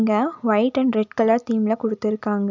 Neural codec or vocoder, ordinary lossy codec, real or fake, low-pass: none; none; real; 7.2 kHz